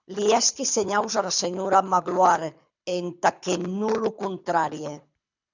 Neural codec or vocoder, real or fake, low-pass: codec, 24 kHz, 6 kbps, HILCodec; fake; 7.2 kHz